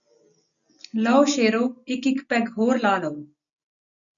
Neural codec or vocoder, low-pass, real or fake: none; 7.2 kHz; real